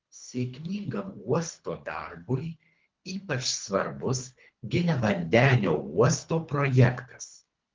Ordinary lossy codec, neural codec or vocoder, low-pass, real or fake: Opus, 32 kbps; codec, 24 kHz, 3 kbps, HILCodec; 7.2 kHz; fake